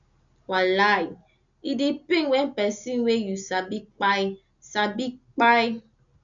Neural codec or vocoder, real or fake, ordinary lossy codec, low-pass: none; real; none; 7.2 kHz